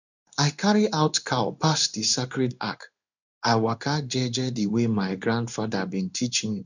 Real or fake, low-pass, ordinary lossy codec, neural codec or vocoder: fake; 7.2 kHz; none; codec, 16 kHz in and 24 kHz out, 1 kbps, XY-Tokenizer